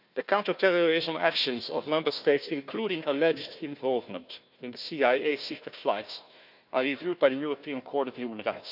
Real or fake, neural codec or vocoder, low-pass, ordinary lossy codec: fake; codec, 16 kHz, 1 kbps, FunCodec, trained on Chinese and English, 50 frames a second; 5.4 kHz; none